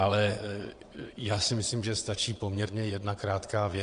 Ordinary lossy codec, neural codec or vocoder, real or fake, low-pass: AAC, 48 kbps; vocoder, 22.05 kHz, 80 mel bands, WaveNeXt; fake; 9.9 kHz